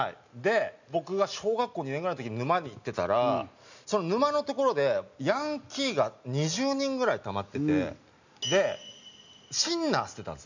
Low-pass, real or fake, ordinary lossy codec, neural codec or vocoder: 7.2 kHz; real; none; none